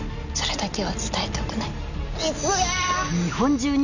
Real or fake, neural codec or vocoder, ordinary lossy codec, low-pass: real; none; none; 7.2 kHz